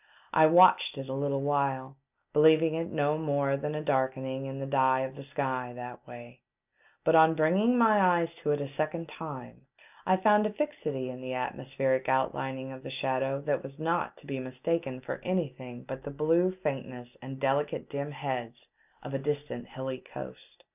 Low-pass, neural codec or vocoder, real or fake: 3.6 kHz; none; real